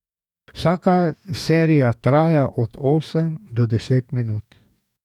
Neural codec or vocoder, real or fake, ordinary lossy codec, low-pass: codec, 44.1 kHz, 2.6 kbps, DAC; fake; none; 19.8 kHz